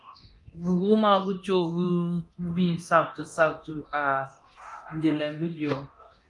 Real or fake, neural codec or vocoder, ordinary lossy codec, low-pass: fake; codec, 24 kHz, 0.9 kbps, DualCodec; Opus, 32 kbps; 10.8 kHz